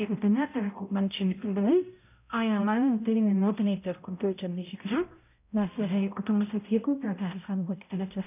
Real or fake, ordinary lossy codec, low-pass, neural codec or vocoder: fake; none; 3.6 kHz; codec, 16 kHz, 0.5 kbps, X-Codec, HuBERT features, trained on balanced general audio